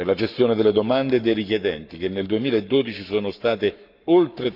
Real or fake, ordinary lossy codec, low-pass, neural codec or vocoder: fake; none; 5.4 kHz; codec, 44.1 kHz, 7.8 kbps, Pupu-Codec